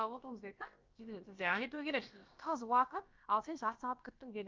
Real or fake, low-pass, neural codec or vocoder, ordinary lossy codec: fake; 7.2 kHz; codec, 16 kHz, about 1 kbps, DyCAST, with the encoder's durations; Opus, 24 kbps